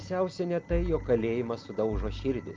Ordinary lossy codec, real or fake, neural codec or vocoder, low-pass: Opus, 24 kbps; real; none; 7.2 kHz